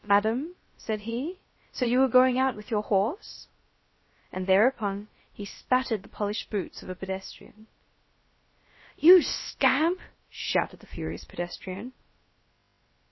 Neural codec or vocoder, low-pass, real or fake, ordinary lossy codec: codec, 16 kHz, about 1 kbps, DyCAST, with the encoder's durations; 7.2 kHz; fake; MP3, 24 kbps